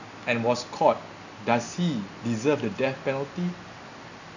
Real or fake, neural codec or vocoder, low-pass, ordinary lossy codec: real; none; 7.2 kHz; none